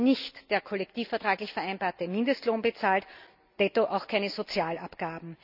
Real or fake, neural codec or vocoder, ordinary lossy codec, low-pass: real; none; none; 5.4 kHz